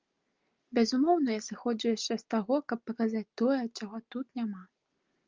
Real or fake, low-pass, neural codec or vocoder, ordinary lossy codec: real; 7.2 kHz; none; Opus, 32 kbps